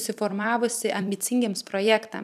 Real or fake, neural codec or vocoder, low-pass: fake; vocoder, 44.1 kHz, 128 mel bands every 256 samples, BigVGAN v2; 14.4 kHz